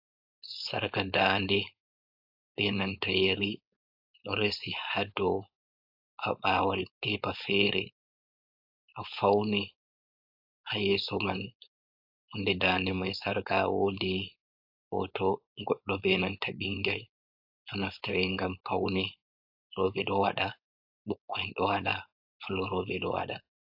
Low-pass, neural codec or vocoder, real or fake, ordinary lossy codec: 5.4 kHz; codec, 16 kHz, 4.8 kbps, FACodec; fake; AAC, 48 kbps